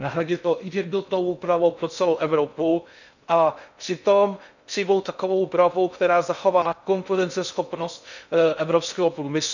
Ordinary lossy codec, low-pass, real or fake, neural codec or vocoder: none; 7.2 kHz; fake; codec, 16 kHz in and 24 kHz out, 0.6 kbps, FocalCodec, streaming, 2048 codes